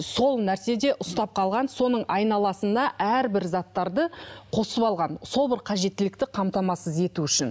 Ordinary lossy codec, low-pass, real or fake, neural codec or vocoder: none; none; real; none